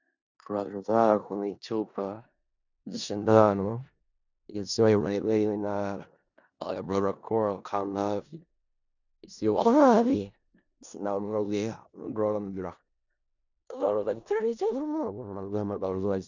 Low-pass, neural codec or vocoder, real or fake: 7.2 kHz; codec, 16 kHz in and 24 kHz out, 0.4 kbps, LongCat-Audio-Codec, four codebook decoder; fake